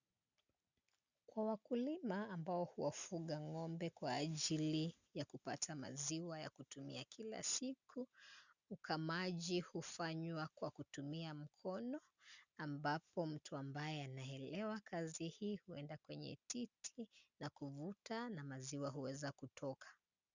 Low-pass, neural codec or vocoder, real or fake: 7.2 kHz; none; real